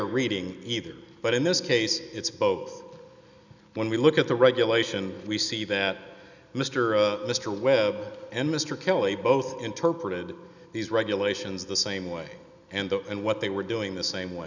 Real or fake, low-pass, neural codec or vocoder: real; 7.2 kHz; none